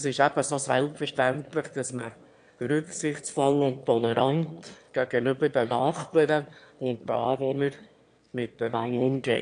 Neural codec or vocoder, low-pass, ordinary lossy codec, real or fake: autoencoder, 22.05 kHz, a latent of 192 numbers a frame, VITS, trained on one speaker; 9.9 kHz; AAC, 64 kbps; fake